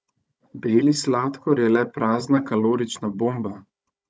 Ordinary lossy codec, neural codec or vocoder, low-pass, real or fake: none; codec, 16 kHz, 16 kbps, FunCodec, trained on Chinese and English, 50 frames a second; none; fake